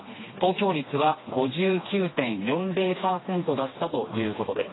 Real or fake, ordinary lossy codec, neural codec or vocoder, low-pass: fake; AAC, 16 kbps; codec, 16 kHz, 2 kbps, FreqCodec, smaller model; 7.2 kHz